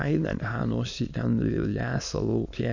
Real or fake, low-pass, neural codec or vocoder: fake; 7.2 kHz; autoencoder, 22.05 kHz, a latent of 192 numbers a frame, VITS, trained on many speakers